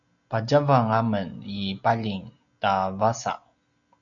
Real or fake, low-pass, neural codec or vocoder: real; 7.2 kHz; none